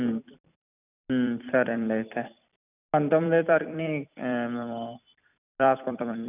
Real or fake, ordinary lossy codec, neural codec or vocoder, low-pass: fake; none; vocoder, 44.1 kHz, 128 mel bands every 256 samples, BigVGAN v2; 3.6 kHz